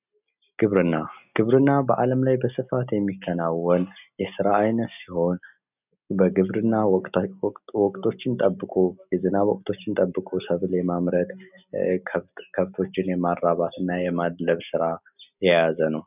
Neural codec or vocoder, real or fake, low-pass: none; real; 3.6 kHz